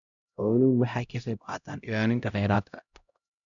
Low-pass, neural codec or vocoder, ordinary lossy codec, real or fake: 7.2 kHz; codec, 16 kHz, 0.5 kbps, X-Codec, HuBERT features, trained on LibriSpeech; none; fake